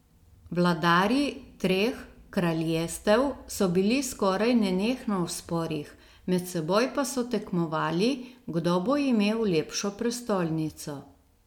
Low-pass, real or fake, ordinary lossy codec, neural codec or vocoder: 19.8 kHz; real; MP3, 96 kbps; none